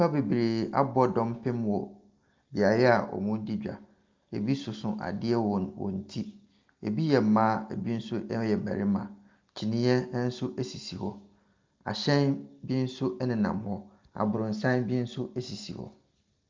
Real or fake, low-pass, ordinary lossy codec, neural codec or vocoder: real; 7.2 kHz; Opus, 24 kbps; none